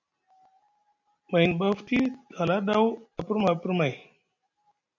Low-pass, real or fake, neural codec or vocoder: 7.2 kHz; real; none